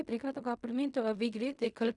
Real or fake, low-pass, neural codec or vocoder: fake; 10.8 kHz; codec, 16 kHz in and 24 kHz out, 0.4 kbps, LongCat-Audio-Codec, fine tuned four codebook decoder